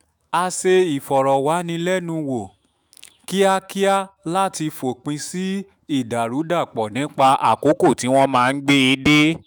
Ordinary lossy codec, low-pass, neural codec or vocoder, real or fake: none; none; autoencoder, 48 kHz, 128 numbers a frame, DAC-VAE, trained on Japanese speech; fake